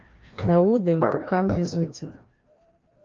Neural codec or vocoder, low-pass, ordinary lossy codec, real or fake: codec, 16 kHz, 1 kbps, FreqCodec, larger model; 7.2 kHz; Opus, 24 kbps; fake